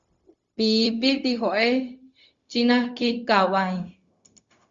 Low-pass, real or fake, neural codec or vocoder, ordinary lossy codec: 7.2 kHz; fake; codec, 16 kHz, 0.4 kbps, LongCat-Audio-Codec; Opus, 64 kbps